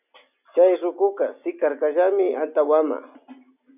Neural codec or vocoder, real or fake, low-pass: none; real; 3.6 kHz